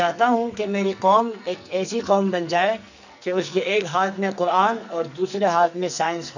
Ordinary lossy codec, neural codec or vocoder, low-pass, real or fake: none; codec, 44.1 kHz, 2.6 kbps, SNAC; 7.2 kHz; fake